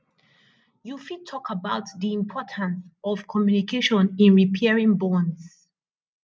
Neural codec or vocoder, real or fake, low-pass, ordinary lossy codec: none; real; none; none